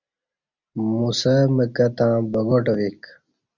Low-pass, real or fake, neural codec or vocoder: 7.2 kHz; real; none